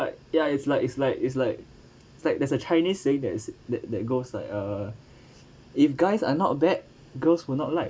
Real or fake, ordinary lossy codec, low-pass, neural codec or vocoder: real; none; none; none